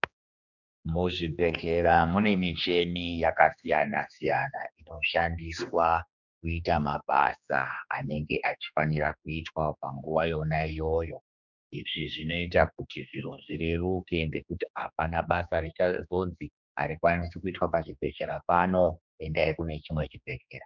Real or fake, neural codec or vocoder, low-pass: fake; codec, 16 kHz, 2 kbps, X-Codec, HuBERT features, trained on general audio; 7.2 kHz